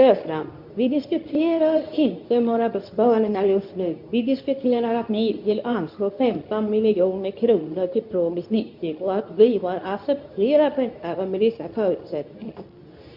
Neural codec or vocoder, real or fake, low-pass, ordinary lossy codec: codec, 24 kHz, 0.9 kbps, WavTokenizer, medium speech release version 2; fake; 5.4 kHz; none